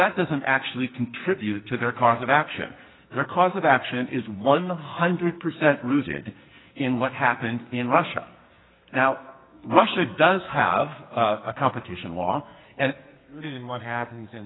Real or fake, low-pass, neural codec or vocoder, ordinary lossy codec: fake; 7.2 kHz; codec, 44.1 kHz, 2.6 kbps, SNAC; AAC, 16 kbps